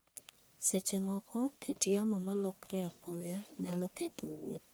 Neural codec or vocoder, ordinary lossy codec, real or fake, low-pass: codec, 44.1 kHz, 1.7 kbps, Pupu-Codec; none; fake; none